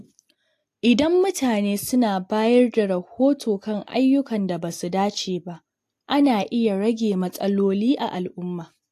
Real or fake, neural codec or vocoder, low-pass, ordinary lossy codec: real; none; 14.4 kHz; AAC, 64 kbps